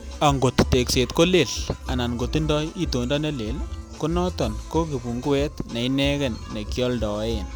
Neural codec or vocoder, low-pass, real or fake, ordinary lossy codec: none; none; real; none